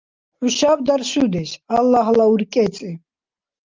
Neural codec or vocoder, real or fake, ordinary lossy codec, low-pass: none; real; Opus, 24 kbps; 7.2 kHz